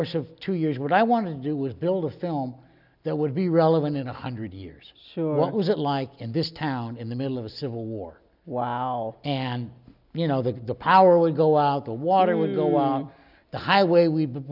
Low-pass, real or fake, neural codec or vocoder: 5.4 kHz; real; none